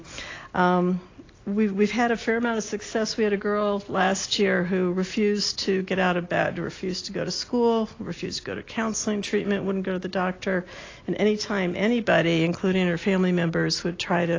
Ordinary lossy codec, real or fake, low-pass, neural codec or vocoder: AAC, 32 kbps; real; 7.2 kHz; none